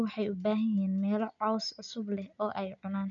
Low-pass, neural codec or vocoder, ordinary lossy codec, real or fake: 7.2 kHz; none; none; real